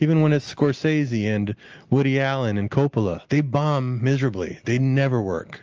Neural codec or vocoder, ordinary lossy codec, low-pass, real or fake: none; Opus, 24 kbps; 7.2 kHz; real